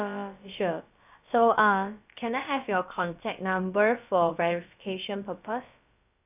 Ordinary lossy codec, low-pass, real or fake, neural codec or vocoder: none; 3.6 kHz; fake; codec, 16 kHz, about 1 kbps, DyCAST, with the encoder's durations